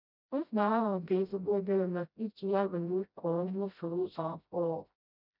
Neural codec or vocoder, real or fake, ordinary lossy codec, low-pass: codec, 16 kHz, 0.5 kbps, FreqCodec, smaller model; fake; none; 5.4 kHz